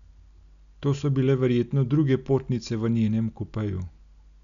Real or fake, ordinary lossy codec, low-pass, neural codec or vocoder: real; none; 7.2 kHz; none